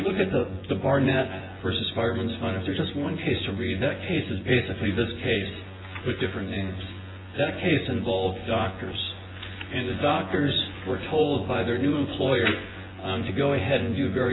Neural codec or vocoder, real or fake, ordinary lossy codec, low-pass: vocoder, 24 kHz, 100 mel bands, Vocos; fake; AAC, 16 kbps; 7.2 kHz